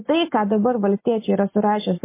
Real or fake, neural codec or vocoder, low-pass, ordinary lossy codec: real; none; 3.6 kHz; MP3, 24 kbps